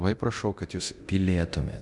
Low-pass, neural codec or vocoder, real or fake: 10.8 kHz; codec, 24 kHz, 0.9 kbps, DualCodec; fake